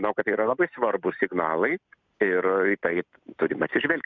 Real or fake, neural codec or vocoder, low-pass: real; none; 7.2 kHz